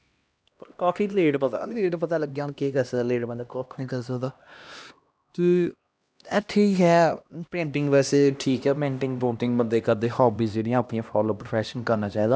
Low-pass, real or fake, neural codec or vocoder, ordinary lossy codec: none; fake; codec, 16 kHz, 1 kbps, X-Codec, HuBERT features, trained on LibriSpeech; none